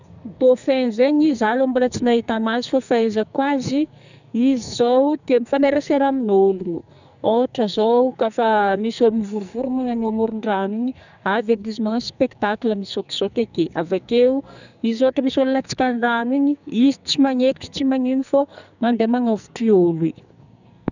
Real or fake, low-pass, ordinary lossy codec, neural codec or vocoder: fake; 7.2 kHz; none; codec, 44.1 kHz, 2.6 kbps, SNAC